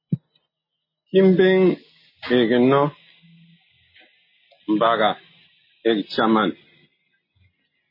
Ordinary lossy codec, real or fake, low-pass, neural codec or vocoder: MP3, 24 kbps; real; 5.4 kHz; none